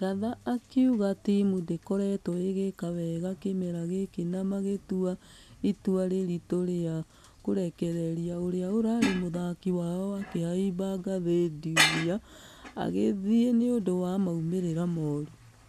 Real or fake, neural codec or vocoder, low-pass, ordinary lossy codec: real; none; 14.4 kHz; none